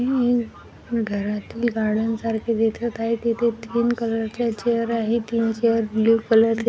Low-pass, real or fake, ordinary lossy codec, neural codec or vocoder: none; real; none; none